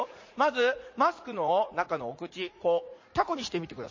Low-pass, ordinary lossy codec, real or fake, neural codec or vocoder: 7.2 kHz; MP3, 32 kbps; fake; vocoder, 44.1 kHz, 80 mel bands, Vocos